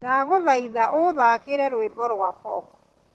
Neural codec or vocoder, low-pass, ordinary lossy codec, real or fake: vocoder, 44.1 kHz, 128 mel bands, Pupu-Vocoder; 19.8 kHz; Opus, 16 kbps; fake